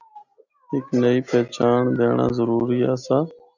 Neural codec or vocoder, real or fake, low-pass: none; real; 7.2 kHz